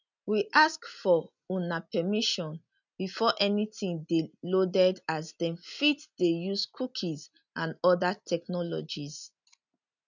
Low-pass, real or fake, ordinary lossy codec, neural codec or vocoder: 7.2 kHz; real; none; none